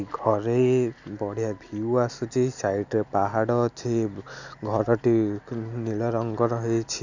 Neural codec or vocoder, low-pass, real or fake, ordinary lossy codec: none; 7.2 kHz; real; none